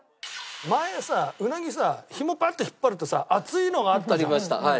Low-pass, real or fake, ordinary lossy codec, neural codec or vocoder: none; real; none; none